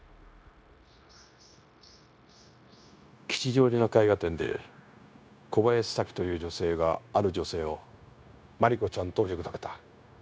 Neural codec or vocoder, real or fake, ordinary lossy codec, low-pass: codec, 16 kHz, 0.9 kbps, LongCat-Audio-Codec; fake; none; none